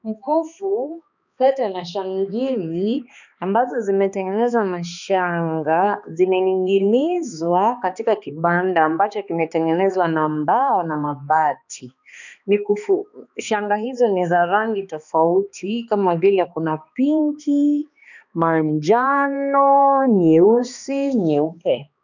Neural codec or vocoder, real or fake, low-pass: codec, 16 kHz, 2 kbps, X-Codec, HuBERT features, trained on balanced general audio; fake; 7.2 kHz